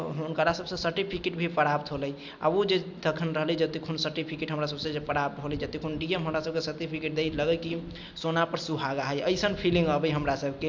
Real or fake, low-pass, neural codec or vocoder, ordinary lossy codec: real; none; none; none